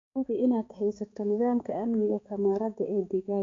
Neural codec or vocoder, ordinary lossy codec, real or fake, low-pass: codec, 16 kHz, 4 kbps, X-Codec, HuBERT features, trained on balanced general audio; AAC, 32 kbps; fake; 7.2 kHz